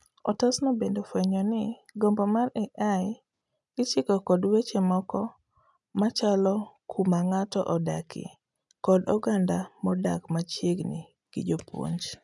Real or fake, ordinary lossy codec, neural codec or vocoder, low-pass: real; none; none; 10.8 kHz